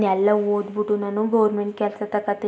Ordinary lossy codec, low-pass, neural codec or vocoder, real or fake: none; none; none; real